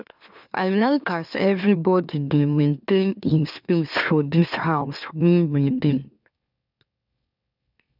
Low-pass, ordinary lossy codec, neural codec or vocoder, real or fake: 5.4 kHz; none; autoencoder, 44.1 kHz, a latent of 192 numbers a frame, MeloTTS; fake